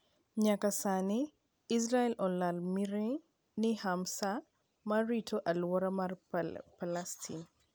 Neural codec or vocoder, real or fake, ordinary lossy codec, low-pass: none; real; none; none